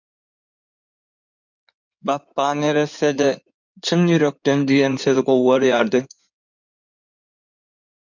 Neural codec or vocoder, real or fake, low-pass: codec, 16 kHz in and 24 kHz out, 2.2 kbps, FireRedTTS-2 codec; fake; 7.2 kHz